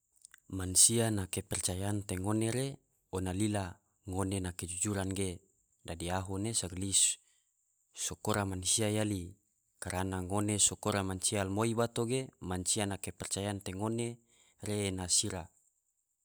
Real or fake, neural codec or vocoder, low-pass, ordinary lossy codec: real; none; none; none